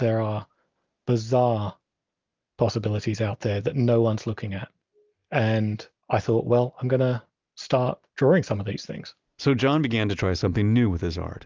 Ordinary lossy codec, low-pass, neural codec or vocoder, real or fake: Opus, 24 kbps; 7.2 kHz; none; real